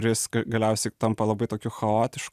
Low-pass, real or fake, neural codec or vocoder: 14.4 kHz; real; none